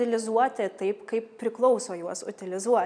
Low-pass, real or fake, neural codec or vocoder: 9.9 kHz; real; none